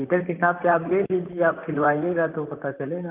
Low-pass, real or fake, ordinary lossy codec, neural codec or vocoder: 3.6 kHz; fake; Opus, 24 kbps; vocoder, 44.1 kHz, 128 mel bands, Pupu-Vocoder